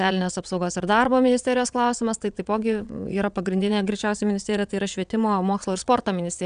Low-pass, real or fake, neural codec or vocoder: 9.9 kHz; fake; vocoder, 22.05 kHz, 80 mel bands, WaveNeXt